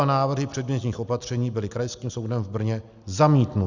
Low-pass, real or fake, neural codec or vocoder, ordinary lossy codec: 7.2 kHz; real; none; Opus, 64 kbps